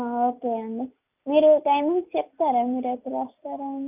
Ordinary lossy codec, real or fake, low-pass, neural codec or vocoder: none; real; 3.6 kHz; none